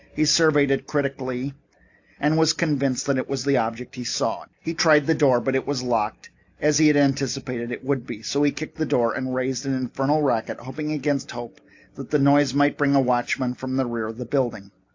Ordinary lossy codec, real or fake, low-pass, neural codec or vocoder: AAC, 48 kbps; real; 7.2 kHz; none